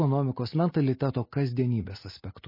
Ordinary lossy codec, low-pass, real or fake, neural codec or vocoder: MP3, 24 kbps; 5.4 kHz; real; none